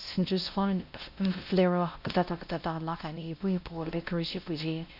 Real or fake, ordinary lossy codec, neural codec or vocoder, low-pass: fake; none; codec, 16 kHz, 1 kbps, X-Codec, WavLM features, trained on Multilingual LibriSpeech; 5.4 kHz